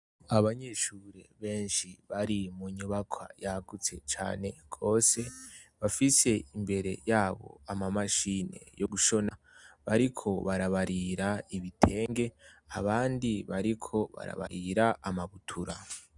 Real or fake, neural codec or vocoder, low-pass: real; none; 10.8 kHz